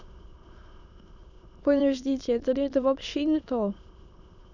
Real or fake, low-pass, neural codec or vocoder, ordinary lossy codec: fake; 7.2 kHz; autoencoder, 22.05 kHz, a latent of 192 numbers a frame, VITS, trained on many speakers; none